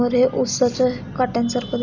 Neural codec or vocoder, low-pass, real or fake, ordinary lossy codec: none; 7.2 kHz; real; none